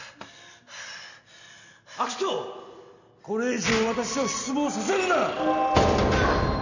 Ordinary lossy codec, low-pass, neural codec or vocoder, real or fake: none; 7.2 kHz; none; real